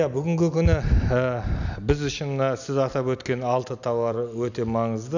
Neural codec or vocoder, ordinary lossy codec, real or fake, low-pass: none; none; real; 7.2 kHz